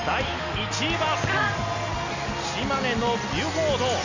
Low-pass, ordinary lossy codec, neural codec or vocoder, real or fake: 7.2 kHz; MP3, 64 kbps; none; real